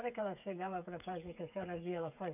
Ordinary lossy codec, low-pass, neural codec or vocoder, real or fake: none; 3.6 kHz; codec, 16 kHz, 8 kbps, FreqCodec, smaller model; fake